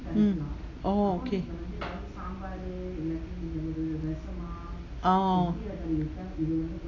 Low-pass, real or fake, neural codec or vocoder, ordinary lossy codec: 7.2 kHz; real; none; AAC, 32 kbps